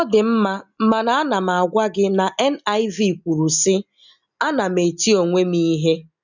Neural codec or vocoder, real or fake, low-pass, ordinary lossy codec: none; real; 7.2 kHz; none